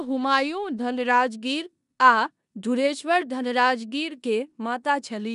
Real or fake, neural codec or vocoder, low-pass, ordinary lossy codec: fake; codec, 16 kHz in and 24 kHz out, 0.9 kbps, LongCat-Audio-Codec, four codebook decoder; 10.8 kHz; none